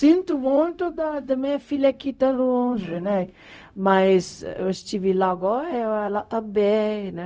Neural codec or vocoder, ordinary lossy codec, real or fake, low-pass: codec, 16 kHz, 0.4 kbps, LongCat-Audio-Codec; none; fake; none